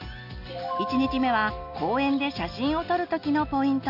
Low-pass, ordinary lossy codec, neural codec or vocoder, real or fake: 5.4 kHz; none; none; real